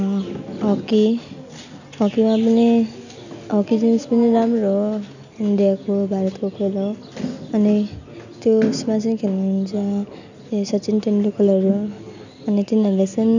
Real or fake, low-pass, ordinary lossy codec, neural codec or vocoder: real; 7.2 kHz; none; none